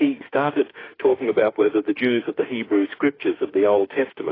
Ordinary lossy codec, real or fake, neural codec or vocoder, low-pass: AAC, 24 kbps; fake; autoencoder, 48 kHz, 32 numbers a frame, DAC-VAE, trained on Japanese speech; 5.4 kHz